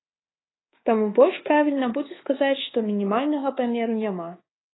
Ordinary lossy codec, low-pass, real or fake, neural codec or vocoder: AAC, 16 kbps; 7.2 kHz; fake; codec, 24 kHz, 1.2 kbps, DualCodec